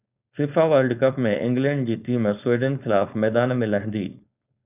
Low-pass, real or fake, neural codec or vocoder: 3.6 kHz; fake; codec, 16 kHz, 4.8 kbps, FACodec